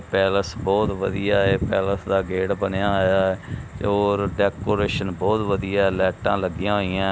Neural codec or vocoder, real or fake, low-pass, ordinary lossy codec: none; real; none; none